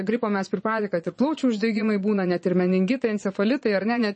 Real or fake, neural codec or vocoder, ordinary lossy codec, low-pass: fake; vocoder, 22.05 kHz, 80 mel bands, Vocos; MP3, 32 kbps; 9.9 kHz